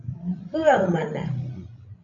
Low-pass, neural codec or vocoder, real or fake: 7.2 kHz; codec, 16 kHz, 16 kbps, FreqCodec, larger model; fake